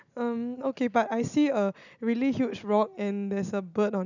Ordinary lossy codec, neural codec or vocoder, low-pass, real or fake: none; none; 7.2 kHz; real